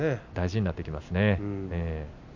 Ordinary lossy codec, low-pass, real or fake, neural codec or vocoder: none; 7.2 kHz; real; none